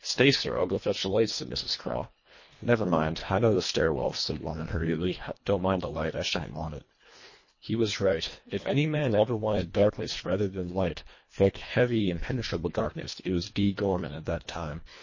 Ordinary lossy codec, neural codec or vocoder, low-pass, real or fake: MP3, 32 kbps; codec, 24 kHz, 1.5 kbps, HILCodec; 7.2 kHz; fake